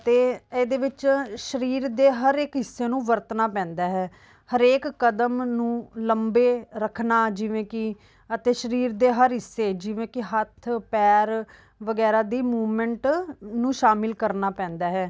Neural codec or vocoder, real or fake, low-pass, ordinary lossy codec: none; real; none; none